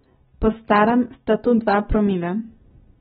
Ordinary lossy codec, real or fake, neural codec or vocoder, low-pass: AAC, 16 kbps; real; none; 7.2 kHz